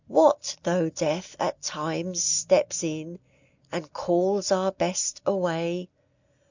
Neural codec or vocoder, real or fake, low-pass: none; real; 7.2 kHz